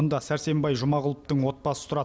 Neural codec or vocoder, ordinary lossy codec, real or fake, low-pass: none; none; real; none